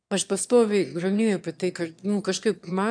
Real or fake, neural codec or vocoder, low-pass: fake; autoencoder, 22.05 kHz, a latent of 192 numbers a frame, VITS, trained on one speaker; 9.9 kHz